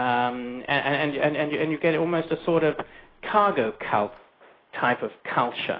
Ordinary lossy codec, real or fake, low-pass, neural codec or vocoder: AAC, 24 kbps; real; 5.4 kHz; none